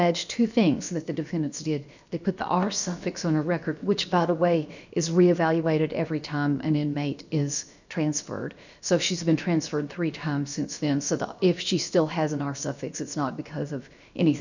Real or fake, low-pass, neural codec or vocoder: fake; 7.2 kHz; codec, 16 kHz, about 1 kbps, DyCAST, with the encoder's durations